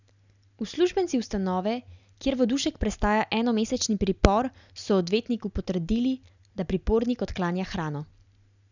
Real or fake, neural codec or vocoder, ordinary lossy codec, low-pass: real; none; none; 7.2 kHz